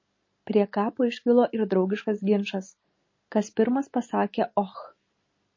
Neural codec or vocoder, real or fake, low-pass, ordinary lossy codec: none; real; 7.2 kHz; MP3, 32 kbps